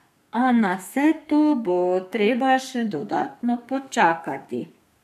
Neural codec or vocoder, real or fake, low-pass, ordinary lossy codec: codec, 32 kHz, 1.9 kbps, SNAC; fake; 14.4 kHz; MP3, 96 kbps